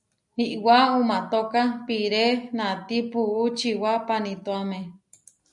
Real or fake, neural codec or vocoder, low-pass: real; none; 10.8 kHz